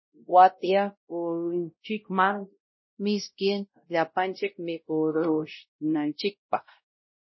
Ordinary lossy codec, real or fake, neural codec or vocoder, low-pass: MP3, 24 kbps; fake; codec, 16 kHz, 0.5 kbps, X-Codec, WavLM features, trained on Multilingual LibriSpeech; 7.2 kHz